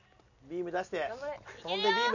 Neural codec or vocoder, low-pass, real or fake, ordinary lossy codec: none; 7.2 kHz; real; none